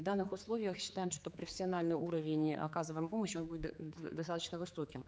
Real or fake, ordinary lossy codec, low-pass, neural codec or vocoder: fake; none; none; codec, 16 kHz, 4 kbps, X-Codec, HuBERT features, trained on general audio